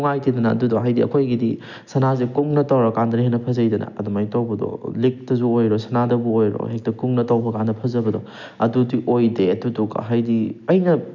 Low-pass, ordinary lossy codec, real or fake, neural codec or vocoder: 7.2 kHz; none; real; none